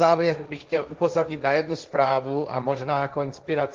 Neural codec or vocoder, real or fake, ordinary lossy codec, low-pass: codec, 16 kHz, 1.1 kbps, Voila-Tokenizer; fake; Opus, 24 kbps; 7.2 kHz